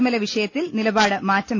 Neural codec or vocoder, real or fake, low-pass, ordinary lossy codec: none; real; 7.2 kHz; none